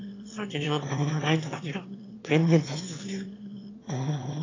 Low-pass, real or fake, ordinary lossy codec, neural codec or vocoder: 7.2 kHz; fake; AAC, 32 kbps; autoencoder, 22.05 kHz, a latent of 192 numbers a frame, VITS, trained on one speaker